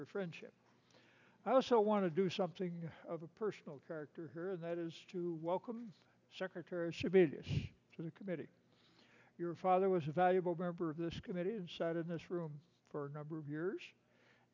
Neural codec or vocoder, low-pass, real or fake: none; 7.2 kHz; real